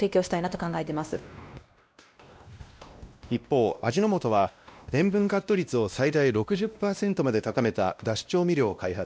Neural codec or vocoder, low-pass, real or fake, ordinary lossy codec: codec, 16 kHz, 1 kbps, X-Codec, WavLM features, trained on Multilingual LibriSpeech; none; fake; none